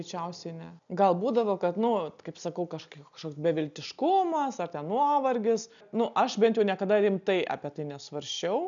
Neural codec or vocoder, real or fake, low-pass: none; real; 7.2 kHz